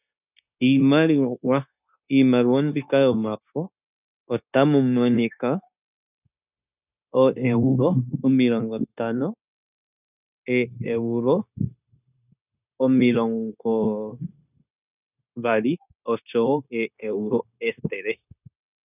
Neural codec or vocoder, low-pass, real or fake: codec, 16 kHz, 0.9 kbps, LongCat-Audio-Codec; 3.6 kHz; fake